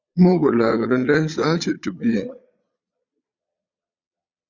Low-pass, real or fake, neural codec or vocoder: 7.2 kHz; fake; vocoder, 22.05 kHz, 80 mel bands, Vocos